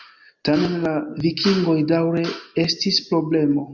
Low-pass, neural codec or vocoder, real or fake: 7.2 kHz; none; real